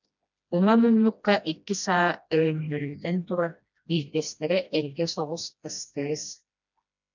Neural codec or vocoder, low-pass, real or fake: codec, 16 kHz, 1 kbps, FreqCodec, smaller model; 7.2 kHz; fake